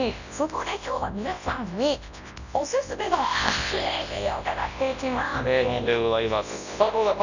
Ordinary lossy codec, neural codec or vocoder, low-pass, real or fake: none; codec, 24 kHz, 0.9 kbps, WavTokenizer, large speech release; 7.2 kHz; fake